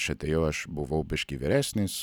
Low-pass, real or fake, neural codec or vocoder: 19.8 kHz; real; none